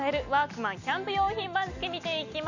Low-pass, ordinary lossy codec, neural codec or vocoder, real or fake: 7.2 kHz; none; none; real